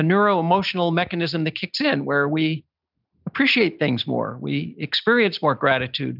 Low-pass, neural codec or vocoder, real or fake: 5.4 kHz; none; real